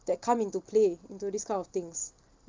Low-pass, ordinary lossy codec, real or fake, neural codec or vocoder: 7.2 kHz; Opus, 24 kbps; real; none